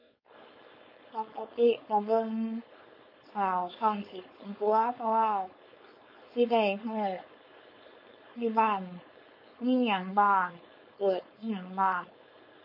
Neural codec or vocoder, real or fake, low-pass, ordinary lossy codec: codec, 16 kHz, 4.8 kbps, FACodec; fake; 5.4 kHz; MP3, 32 kbps